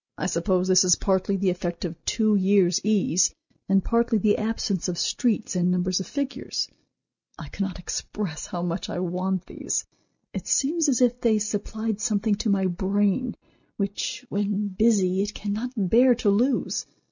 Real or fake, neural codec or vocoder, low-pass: real; none; 7.2 kHz